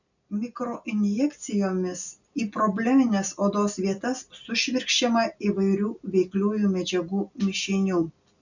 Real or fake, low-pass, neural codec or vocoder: real; 7.2 kHz; none